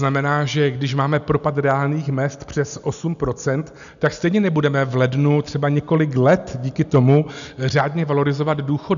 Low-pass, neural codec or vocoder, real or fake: 7.2 kHz; none; real